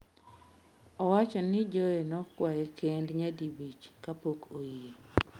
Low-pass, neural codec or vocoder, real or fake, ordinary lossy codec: 19.8 kHz; none; real; Opus, 32 kbps